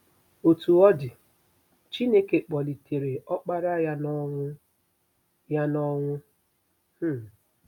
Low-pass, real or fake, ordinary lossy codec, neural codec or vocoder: 19.8 kHz; real; none; none